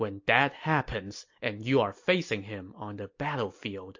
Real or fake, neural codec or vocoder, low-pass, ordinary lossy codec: real; none; 7.2 kHz; MP3, 48 kbps